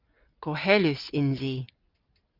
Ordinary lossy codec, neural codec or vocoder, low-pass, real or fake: Opus, 32 kbps; none; 5.4 kHz; real